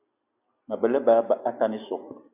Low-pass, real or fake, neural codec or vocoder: 3.6 kHz; real; none